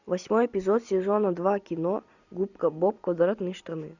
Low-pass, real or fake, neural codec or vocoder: 7.2 kHz; real; none